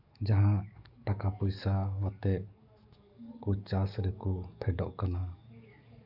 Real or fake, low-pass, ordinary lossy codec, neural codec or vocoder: fake; 5.4 kHz; none; codec, 44.1 kHz, 7.8 kbps, DAC